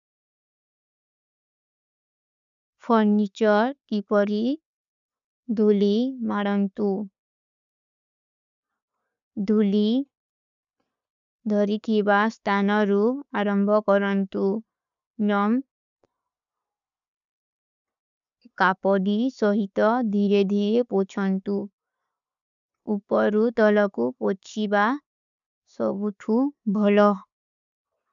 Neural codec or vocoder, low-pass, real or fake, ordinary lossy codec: none; 7.2 kHz; real; none